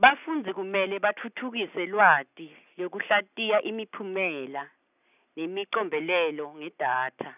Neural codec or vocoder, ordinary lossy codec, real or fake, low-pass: none; none; real; 3.6 kHz